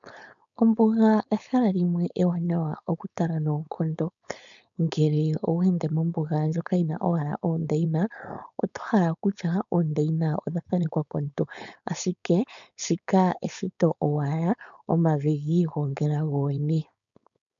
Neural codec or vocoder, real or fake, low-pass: codec, 16 kHz, 4.8 kbps, FACodec; fake; 7.2 kHz